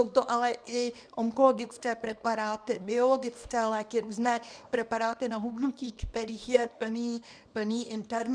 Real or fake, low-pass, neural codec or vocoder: fake; 9.9 kHz; codec, 24 kHz, 0.9 kbps, WavTokenizer, small release